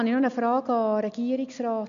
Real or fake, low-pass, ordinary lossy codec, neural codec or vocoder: real; 7.2 kHz; none; none